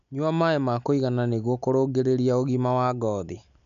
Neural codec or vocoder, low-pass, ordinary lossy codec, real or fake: none; 7.2 kHz; none; real